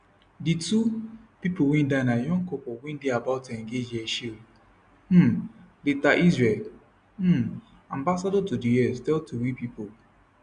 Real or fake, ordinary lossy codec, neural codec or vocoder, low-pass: real; MP3, 96 kbps; none; 9.9 kHz